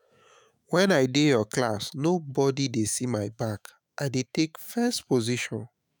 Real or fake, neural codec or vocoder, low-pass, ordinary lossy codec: fake; autoencoder, 48 kHz, 128 numbers a frame, DAC-VAE, trained on Japanese speech; none; none